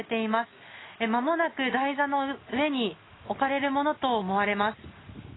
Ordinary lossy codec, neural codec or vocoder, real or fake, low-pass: AAC, 16 kbps; none; real; 7.2 kHz